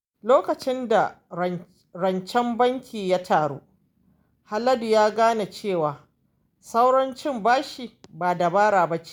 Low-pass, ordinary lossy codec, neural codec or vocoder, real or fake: none; none; none; real